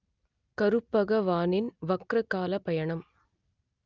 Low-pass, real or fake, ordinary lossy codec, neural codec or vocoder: 7.2 kHz; real; Opus, 24 kbps; none